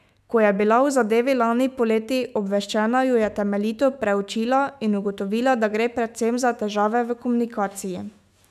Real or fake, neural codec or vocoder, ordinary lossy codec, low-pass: fake; autoencoder, 48 kHz, 128 numbers a frame, DAC-VAE, trained on Japanese speech; none; 14.4 kHz